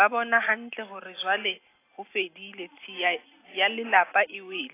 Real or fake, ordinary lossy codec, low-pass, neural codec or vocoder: real; AAC, 24 kbps; 3.6 kHz; none